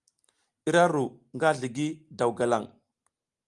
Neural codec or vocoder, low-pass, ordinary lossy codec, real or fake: none; 10.8 kHz; Opus, 32 kbps; real